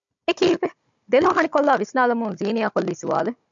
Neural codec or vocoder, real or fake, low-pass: codec, 16 kHz, 16 kbps, FunCodec, trained on Chinese and English, 50 frames a second; fake; 7.2 kHz